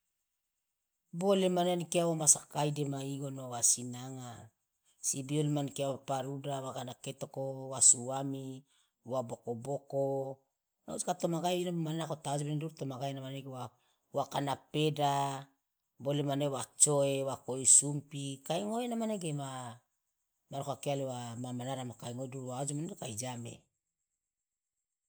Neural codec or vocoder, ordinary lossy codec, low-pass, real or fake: none; none; none; real